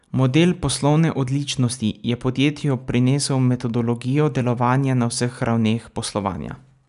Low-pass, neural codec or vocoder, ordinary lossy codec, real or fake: 10.8 kHz; none; none; real